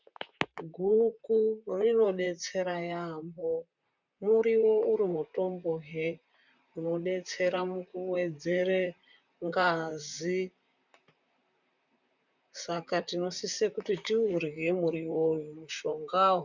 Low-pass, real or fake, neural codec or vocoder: 7.2 kHz; fake; vocoder, 44.1 kHz, 128 mel bands, Pupu-Vocoder